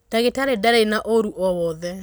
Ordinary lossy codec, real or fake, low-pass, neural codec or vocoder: none; real; none; none